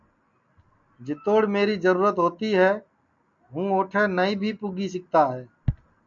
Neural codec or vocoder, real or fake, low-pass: none; real; 7.2 kHz